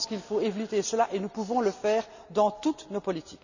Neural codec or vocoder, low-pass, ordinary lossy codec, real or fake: none; 7.2 kHz; none; real